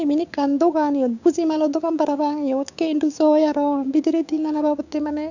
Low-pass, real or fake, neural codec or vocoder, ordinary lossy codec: 7.2 kHz; fake; codec, 16 kHz, 6 kbps, DAC; none